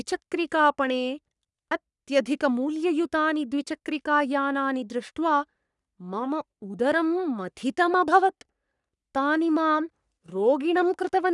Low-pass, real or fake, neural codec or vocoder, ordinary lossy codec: 10.8 kHz; fake; codec, 44.1 kHz, 3.4 kbps, Pupu-Codec; none